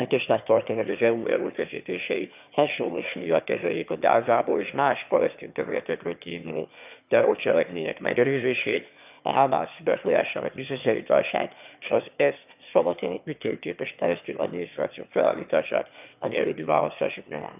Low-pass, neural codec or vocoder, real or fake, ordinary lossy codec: 3.6 kHz; autoencoder, 22.05 kHz, a latent of 192 numbers a frame, VITS, trained on one speaker; fake; none